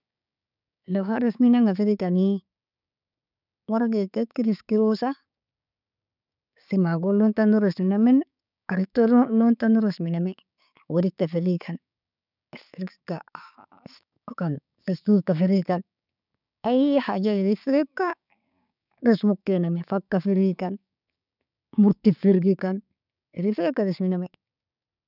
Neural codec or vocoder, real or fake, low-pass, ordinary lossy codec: codec, 16 kHz, 6 kbps, DAC; fake; 5.4 kHz; none